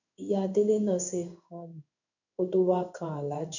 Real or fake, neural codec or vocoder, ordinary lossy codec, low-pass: fake; codec, 16 kHz in and 24 kHz out, 1 kbps, XY-Tokenizer; none; 7.2 kHz